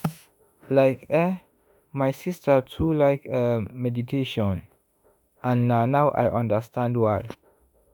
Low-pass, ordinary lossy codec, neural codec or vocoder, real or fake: none; none; autoencoder, 48 kHz, 32 numbers a frame, DAC-VAE, trained on Japanese speech; fake